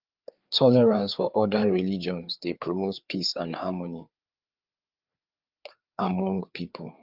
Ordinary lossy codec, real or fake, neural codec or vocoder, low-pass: Opus, 32 kbps; fake; codec, 16 kHz, 4 kbps, FreqCodec, larger model; 5.4 kHz